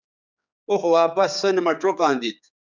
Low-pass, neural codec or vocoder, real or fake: 7.2 kHz; codec, 16 kHz, 4 kbps, X-Codec, HuBERT features, trained on general audio; fake